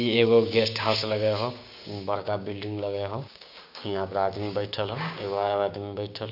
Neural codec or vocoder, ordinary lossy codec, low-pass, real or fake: codec, 16 kHz, 6 kbps, DAC; none; 5.4 kHz; fake